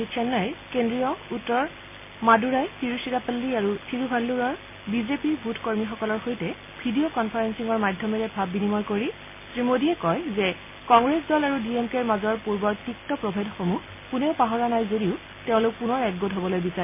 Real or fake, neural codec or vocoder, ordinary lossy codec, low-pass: real; none; MP3, 24 kbps; 3.6 kHz